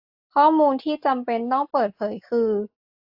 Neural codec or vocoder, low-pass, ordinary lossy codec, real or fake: none; 5.4 kHz; AAC, 48 kbps; real